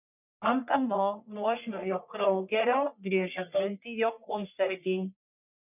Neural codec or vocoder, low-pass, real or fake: codec, 44.1 kHz, 1.7 kbps, Pupu-Codec; 3.6 kHz; fake